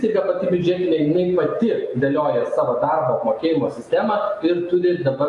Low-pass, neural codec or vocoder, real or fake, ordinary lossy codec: 10.8 kHz; none; real; AAC, 48 kbps